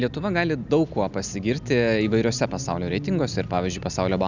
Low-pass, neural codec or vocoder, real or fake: 7.2 kHz; none; real